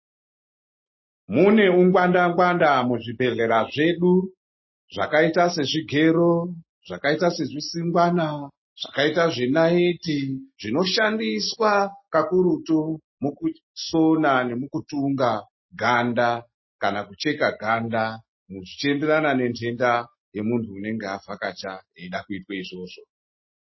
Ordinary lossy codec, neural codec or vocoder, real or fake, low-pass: MP3, 24 kbps; none; real; 7.2 kHz